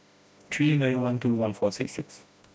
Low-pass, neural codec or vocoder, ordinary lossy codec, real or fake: none; codec, 16 kHz, 1 kbps, FreqCodec, smaller model; none; fake